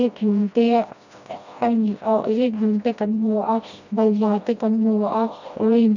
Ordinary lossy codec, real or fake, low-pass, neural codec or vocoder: none; fake; 7.2 kHz; codec, 16 kHz, 1 kbps, FreqCodec, smaller model